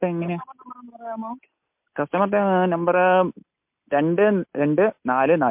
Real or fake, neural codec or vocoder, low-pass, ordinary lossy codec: real; none; 3.6 kHz; MP3, 32 kbps